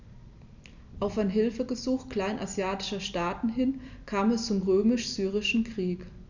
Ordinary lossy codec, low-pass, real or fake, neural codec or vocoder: none; 7.2 kHz; real; none